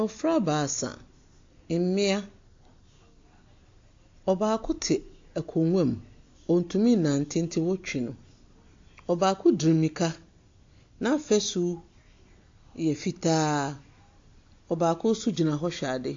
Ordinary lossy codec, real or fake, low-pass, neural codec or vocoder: AAC, 48 kbps; real; 7.2 kHz; none